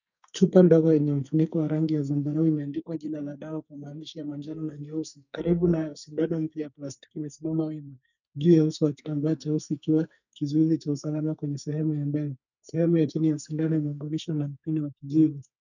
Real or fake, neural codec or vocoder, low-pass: fake; codec, 44.1 kHz, 2.6 kbps, SNAC; 7.2 kHz